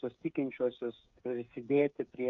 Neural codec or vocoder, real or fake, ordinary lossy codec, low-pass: codec, 16 kHz, 8 kbps, FreqCodec, smaller model; fake; AAC, 48 kbps; 7.2 kHz